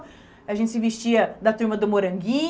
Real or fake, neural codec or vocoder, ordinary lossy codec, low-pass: real; none; none; none